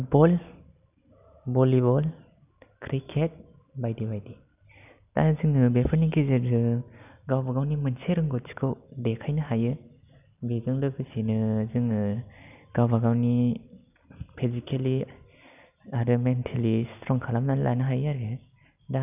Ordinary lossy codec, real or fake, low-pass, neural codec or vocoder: none; real; 3.6 kHz; none